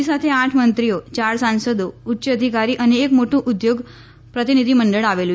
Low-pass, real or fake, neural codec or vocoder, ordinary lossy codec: none; real; none; none